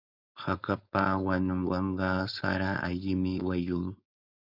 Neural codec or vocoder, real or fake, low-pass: codec, 16 kHz, 4.8 kbps, FACodec; fake; 5.4 kHz